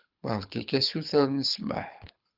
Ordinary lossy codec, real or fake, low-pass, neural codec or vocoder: Opus, 24 kbps; fake; 5.4 kHz; codec, 24 kHz, 6 kbps, HILCodec